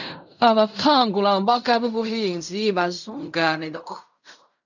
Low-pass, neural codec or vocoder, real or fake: 7.2 kHz; codec, 16 kHz in and 24 kHz out, 0.4 kbps, LongCat-Audio-Codec, fine tuned four codebook decoder; fake